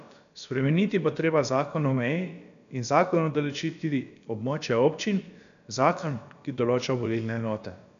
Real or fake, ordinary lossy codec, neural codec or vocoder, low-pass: fake; none; codec, 16 kHz, about 1 kbps, DyCAST, with the encoder's durations; 7.2 kHz